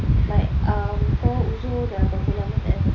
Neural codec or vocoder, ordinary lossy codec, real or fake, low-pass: none; none; real; 7.2 kHz